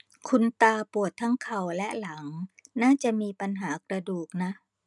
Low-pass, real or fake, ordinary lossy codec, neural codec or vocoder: 10.8 kHz; real; none; none